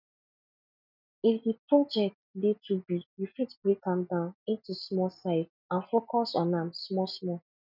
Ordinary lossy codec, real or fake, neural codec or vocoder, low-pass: none; real; none; 5.4 kHz